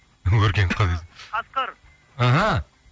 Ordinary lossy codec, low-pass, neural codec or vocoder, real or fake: none; none; none; real